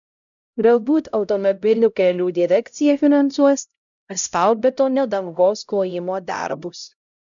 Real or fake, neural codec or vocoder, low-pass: fake; codec, 16 kHz, 0.5 kbps, X-Codec, HuBERT features, trained on LibriSpeech; 7.2 kHz